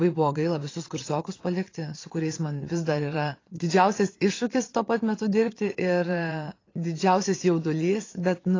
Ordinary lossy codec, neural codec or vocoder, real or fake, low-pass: AAC, 32 kbps; vocoder, 22.05 kHz, 80 mel bands, Vocos; fake; 7.2 kHz